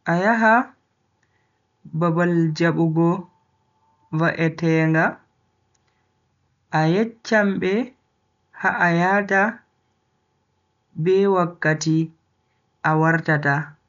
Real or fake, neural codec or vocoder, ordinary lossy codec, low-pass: real; none; none; 7.2 kHz